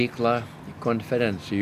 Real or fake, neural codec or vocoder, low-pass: real; none; 14.4 kHz